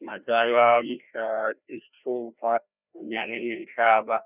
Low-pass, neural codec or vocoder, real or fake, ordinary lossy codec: 3.6 kHz; codec, 16 kHz, 1 kbps, FreqCodec, larger model; fake; none